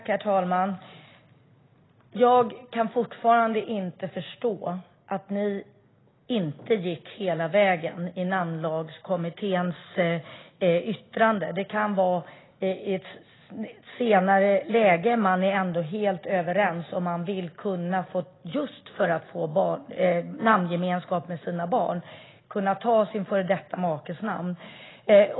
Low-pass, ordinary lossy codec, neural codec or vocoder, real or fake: 7.2 kHz; AAC, 16 kbps; none; real